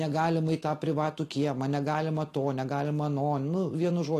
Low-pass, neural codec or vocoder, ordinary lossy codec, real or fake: 14.4 kHz; none; AAC, 48 kbps; real